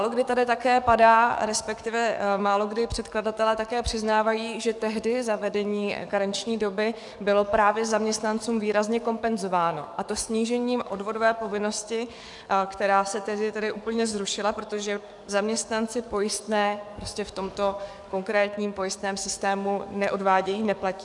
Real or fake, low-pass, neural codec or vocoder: fake; 10.8 kHz; codec, 44.1 kHz, 7.8 kbps, DAC